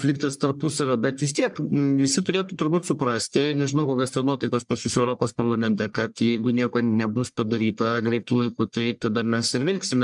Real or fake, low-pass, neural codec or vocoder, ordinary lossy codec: fake; 10.8 kHz; codec, 44.1 kHz, 1.7 kbps, Pupu-Codec; MP3, 96 kbps